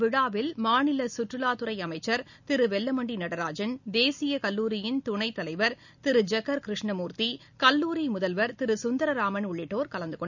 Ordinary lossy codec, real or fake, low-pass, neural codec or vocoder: none; real; 7.2 kHz; none